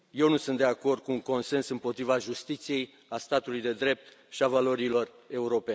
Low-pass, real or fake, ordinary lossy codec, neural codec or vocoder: none; real; none; none